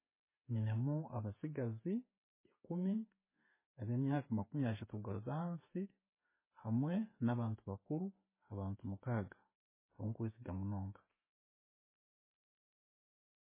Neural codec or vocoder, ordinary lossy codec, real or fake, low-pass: none; MP3, 16 kbps; real; 3.6 kHz